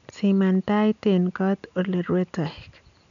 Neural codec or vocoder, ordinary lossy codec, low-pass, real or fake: none; none; 7.2 kHz; real